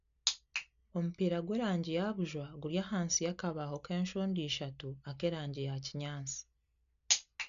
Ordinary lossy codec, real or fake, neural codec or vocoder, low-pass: none; real; none; 7.2 kHz